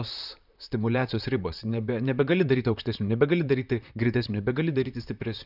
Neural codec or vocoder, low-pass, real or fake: vocoder, 44.1 kHz, 128 mel bands, Pupu-Vocoder; 5.4 kHz; fake